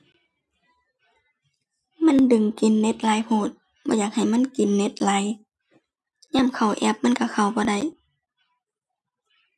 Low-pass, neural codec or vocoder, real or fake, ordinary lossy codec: none; none; real; none